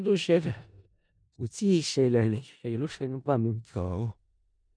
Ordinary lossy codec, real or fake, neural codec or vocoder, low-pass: none; fake; codec, 16 kHz in and 24 kHz out, 0.4 kbps, LongCat-Audio-Codec, four codebook decoder; 9.9 kHz